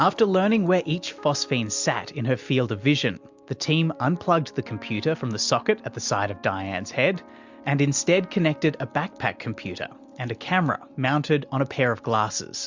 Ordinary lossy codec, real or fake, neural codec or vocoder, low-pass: MP3, 64 kbps; real; none; 7.2 kHz